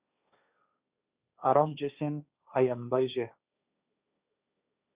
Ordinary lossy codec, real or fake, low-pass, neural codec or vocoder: Opus, 64 kbps; fake; 3.6 kHz; codec, 16 kHz, 1.1 kbps, Voila-Tokenizer